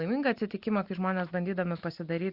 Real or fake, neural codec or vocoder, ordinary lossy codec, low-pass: real; none; Opus, 64 kbps; 5.4 kHz